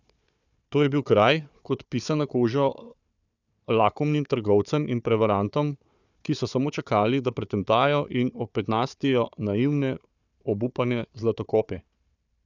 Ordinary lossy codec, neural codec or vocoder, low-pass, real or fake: none; codec, 16 kHz, 4 kbps, FunCodec, trained on Chinese and English, 50 frames a second; 7.2 kHz; fake